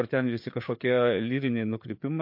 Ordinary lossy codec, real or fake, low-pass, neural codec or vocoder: MP3, 32 kbps; fake; 5.4 kHz; codec, 16 kHz, 4 kbps, FreqCodec, larger model